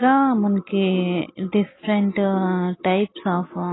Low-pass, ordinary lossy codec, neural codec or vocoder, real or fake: 7.2 kHz; AAC, 16 kbps; none; real